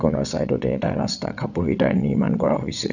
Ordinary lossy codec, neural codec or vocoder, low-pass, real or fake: none; vocoder, 22.05 kHz, 80 mel bands, WaveNeXt; 7.2 kHz; fake